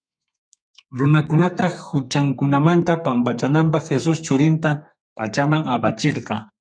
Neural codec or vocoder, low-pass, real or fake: codec, 32 kHz, 1.9 kbps, SNAC; 9.9 kHz; fake